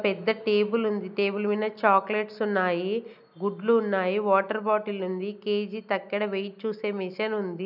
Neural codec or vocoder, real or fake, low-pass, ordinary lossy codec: none; real; 5.4 kHz; none